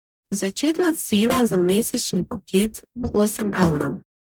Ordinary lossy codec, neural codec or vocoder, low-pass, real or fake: none; codec, 44.1 kHz, 0.9 kbps, DAC; none; fake